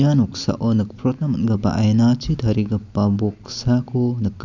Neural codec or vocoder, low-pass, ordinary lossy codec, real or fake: none; 7.2 kHz; none; real